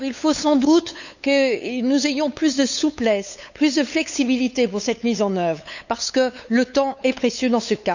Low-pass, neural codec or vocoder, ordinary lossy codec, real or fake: 7.2 kHz; codec, 16 kHz, 8 kbps, FunCodec, trained on LibriTTS, 25 frames a second; none; fake